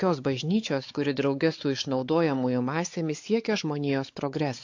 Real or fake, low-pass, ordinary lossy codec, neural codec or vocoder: fake; 7.2 kHz; AAC, 48 kbps; codec, 16 kHz, 4 kbps, X-Codec, WavLM features, trained on Multilingual LibriSpeech